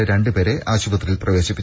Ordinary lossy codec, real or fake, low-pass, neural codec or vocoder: none; real; none; none